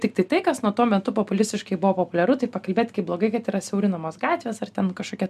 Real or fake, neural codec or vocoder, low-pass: real; none; 14.4 kHz